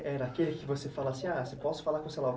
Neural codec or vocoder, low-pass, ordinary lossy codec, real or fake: none; none; none; real